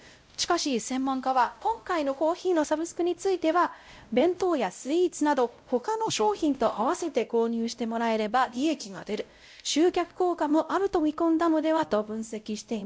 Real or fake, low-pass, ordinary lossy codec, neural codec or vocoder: fake; none; none; codec, 16 kHz, 0.5 kbps, X-Codec, WavLM features, trained on Multilingual LibriSpeech